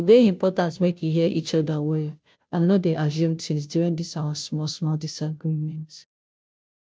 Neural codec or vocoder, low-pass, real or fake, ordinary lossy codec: codec, 16 kHz, 0.5 kbps, FunCodec, trained on Chinese and English, 25 frames a second; none; fake; none